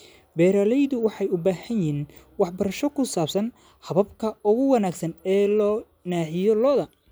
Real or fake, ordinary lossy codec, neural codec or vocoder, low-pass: real; none; none; none